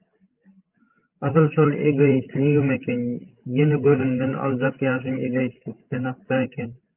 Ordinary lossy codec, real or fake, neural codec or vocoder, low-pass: Opus, 32 kbps; fake; codec, 16 kHz, 16 kbps, FreqCodec, larger model; 3.6 kHz